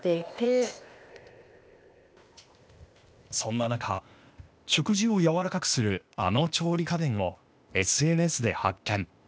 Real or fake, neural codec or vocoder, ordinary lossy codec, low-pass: fake; codec, 16 kHz, 0.8 kbps, ZipCodec; none; none